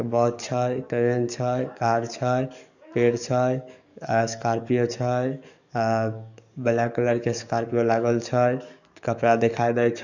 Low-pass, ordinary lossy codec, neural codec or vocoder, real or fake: 7.2 kHz; none; codec, 44.1 kHz, 7.8 kbps, DAC; fake